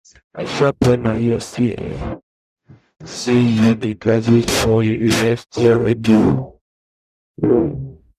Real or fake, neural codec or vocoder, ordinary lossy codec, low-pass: fake; codec, 44.1 kHz, 0.9 kbps, DAC; none; 14.4 kHz